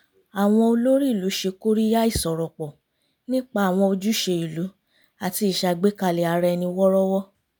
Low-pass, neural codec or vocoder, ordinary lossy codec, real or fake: none; none; none; real